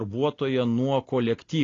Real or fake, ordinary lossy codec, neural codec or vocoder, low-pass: real; AAC, 32 kbps; none; 7.2 kHz